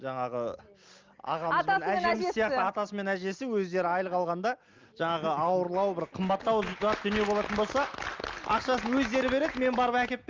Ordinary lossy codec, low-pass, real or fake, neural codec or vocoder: Opus, 24 kbps; 7.2 kHz; real; none